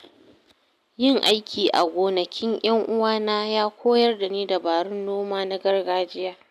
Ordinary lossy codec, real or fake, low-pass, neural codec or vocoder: none; real; 14.4 kHz; none